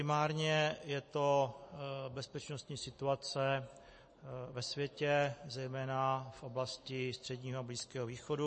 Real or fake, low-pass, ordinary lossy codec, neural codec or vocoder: real; 9.9 kHz; MP3, 32 kbps; none